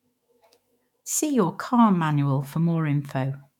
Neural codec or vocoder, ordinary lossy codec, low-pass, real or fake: autoencoder, 48 kHz, 128 numbers a frame, DAC-VAE, trained on Japanese speech; MP3, 96 kbps; 19.8 kHz; fake